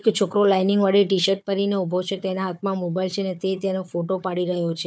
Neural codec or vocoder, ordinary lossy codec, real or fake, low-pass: codec, 16 kHz, 4 kbps, FunCodec, trained on Chinese and English, 50 frames a second; none; fake; none